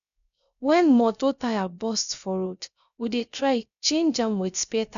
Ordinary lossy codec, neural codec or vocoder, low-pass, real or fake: none; codec, 16 kHz, 0.3 kbps, FocalCodec; 7.2 kHz; fake